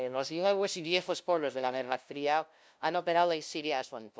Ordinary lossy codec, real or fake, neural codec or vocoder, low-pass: none; fake; codec, 16 kHz, 0.5 kbps, FunCodec, trained on LibriTTS, 25 frames a second; none